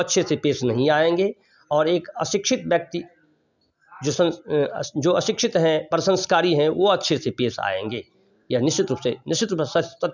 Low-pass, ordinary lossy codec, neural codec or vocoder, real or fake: 7.2 kHz; none; none; real